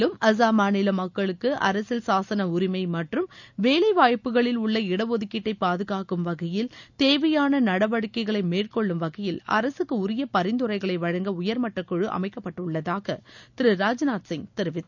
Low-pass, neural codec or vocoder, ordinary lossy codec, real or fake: 7.2 kHz; none; none; real